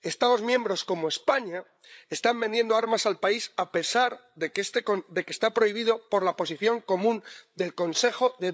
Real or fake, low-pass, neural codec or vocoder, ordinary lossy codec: fake; none; codec, 16 kHz, 8 kbps, FreqCodec, larger model; none